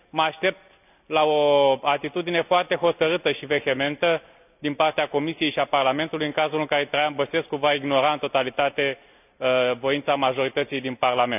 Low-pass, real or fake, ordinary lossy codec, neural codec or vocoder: 3.6 kHz; real; none; none